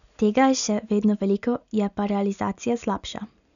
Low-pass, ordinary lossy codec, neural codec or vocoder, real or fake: 7.2 kHz; none; none; real